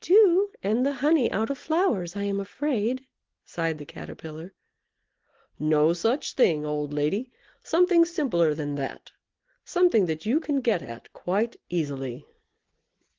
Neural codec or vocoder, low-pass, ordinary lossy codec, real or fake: none; 7.2 kHz; Opus, 16 kbps; real